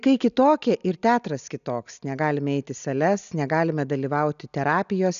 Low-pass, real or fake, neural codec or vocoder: 7.2 kHz; real; none